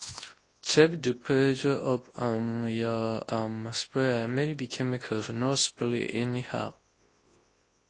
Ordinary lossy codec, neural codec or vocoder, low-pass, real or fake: AAC, 32 kbps; codec, 24 kHz, 0.9 kbps, WavTokenizer, large speech release; 10.8 kHz; fake